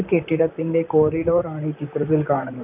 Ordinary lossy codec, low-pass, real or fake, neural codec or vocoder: none; 3.6 kHz; fake; vocoder, 44.1 kHz, 128 mel bands, Pupu-Vocoder